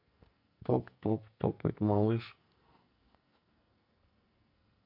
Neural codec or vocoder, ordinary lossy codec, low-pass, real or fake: codec, 44.1 kHz, 2.6 kbps, SNAC; none; 5.4 kHz; fake